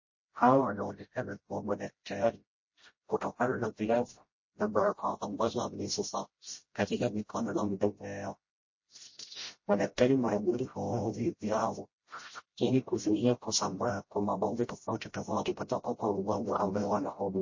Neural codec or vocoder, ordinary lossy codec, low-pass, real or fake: codec, 16 kHz, 0.5 kbps, FreqCodec, smaller model; MP3, 32 kbps; 7.2 kHz; fake